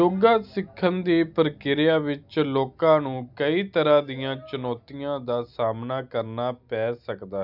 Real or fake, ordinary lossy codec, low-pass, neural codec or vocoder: real; none; 5.4 kHz; none